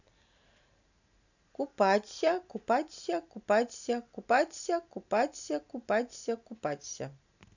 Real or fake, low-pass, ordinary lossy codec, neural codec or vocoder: real; 7.2 kHz; none; none